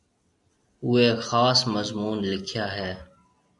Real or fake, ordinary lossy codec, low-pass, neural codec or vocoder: real; MP3, 96 kbps; 10.8 kHz; none